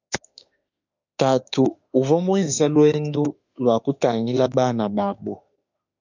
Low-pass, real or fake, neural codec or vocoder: 7.2 kHz; fake; autoencoder, 48 kHz, 32 numbers a frame, DAC-VAE, trained on Japanese speech